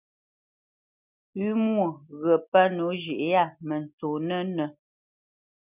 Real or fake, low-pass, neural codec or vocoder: real; 3.6 kHz; none